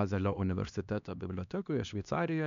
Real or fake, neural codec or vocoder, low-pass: fake; codec, 16 kHz, 2 kbps, X-Codec, HuBERT features, trained on LibriSpeech; 7.2 kHz